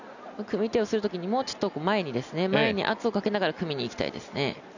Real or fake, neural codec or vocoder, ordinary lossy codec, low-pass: real; none; none; 7.2 kHz